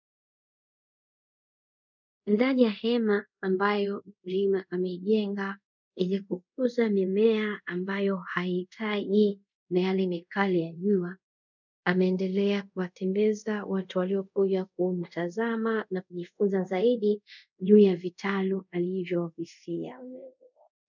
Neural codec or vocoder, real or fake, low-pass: codec, 24 kHz, 0.5 kbps, DualCodec; fake; 7.2 kHz